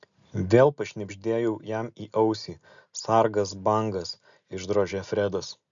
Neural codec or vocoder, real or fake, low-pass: none; real; 7.2 kHz